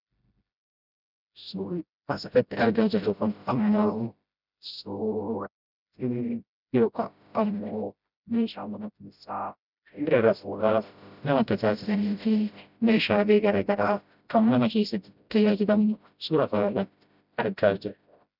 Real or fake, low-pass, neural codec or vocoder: fake; 5.4 kHz; codec, 16 kHz, 0.5 kbps, FreqCodec, smaller model